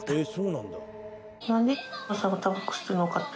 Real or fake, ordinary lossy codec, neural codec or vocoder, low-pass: real; none; none; none